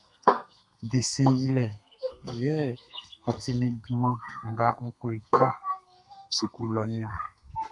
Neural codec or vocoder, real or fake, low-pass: codec, 32 kHz, 1.9 kbps, SNAC; fake; 10.8 kHz